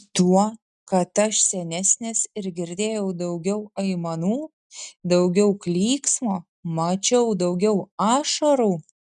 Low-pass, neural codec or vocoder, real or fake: 10.8 kHz; none; real